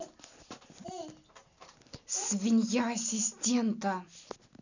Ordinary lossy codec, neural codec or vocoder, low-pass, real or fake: none; none; 7.2 kHz; real